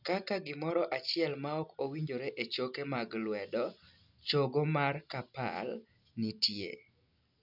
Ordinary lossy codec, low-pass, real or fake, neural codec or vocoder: none; 5.4 kHz; real; none